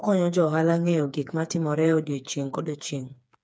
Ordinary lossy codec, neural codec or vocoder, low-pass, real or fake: none; codec, 16 kHz, 4 kbps, FreqCodec, smaller model; none; fake